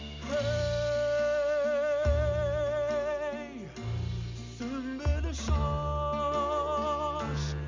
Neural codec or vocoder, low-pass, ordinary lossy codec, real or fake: none; 7.2 kHz; MP3, 64 kbps; real